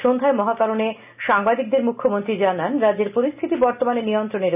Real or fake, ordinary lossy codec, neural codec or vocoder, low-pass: real; none; none; 3.6 kHz